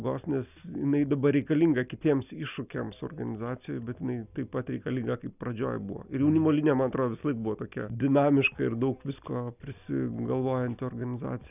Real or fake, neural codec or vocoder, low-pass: real; none; 3.6 kHz